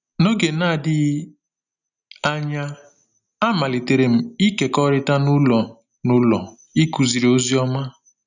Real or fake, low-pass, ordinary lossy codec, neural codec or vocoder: real; 7.2 kHz; none; none